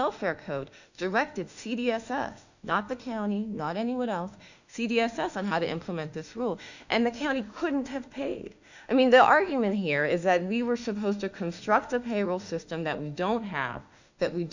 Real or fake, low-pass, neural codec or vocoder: fake; 7.2 kHz; autoencoder, 48 kHz, 32 numbers a frame, DAC-VAE, trained on Japanese speech